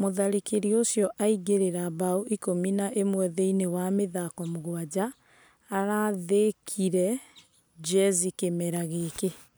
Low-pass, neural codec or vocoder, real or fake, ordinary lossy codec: none; none; real; none